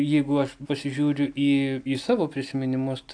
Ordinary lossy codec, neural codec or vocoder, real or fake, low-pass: AAC, 64 kbps; none; real; 9.9 kHz